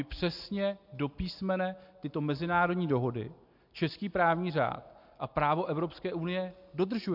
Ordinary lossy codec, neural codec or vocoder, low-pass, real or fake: MP3, 48 kbps; none; 5.4 kHz; real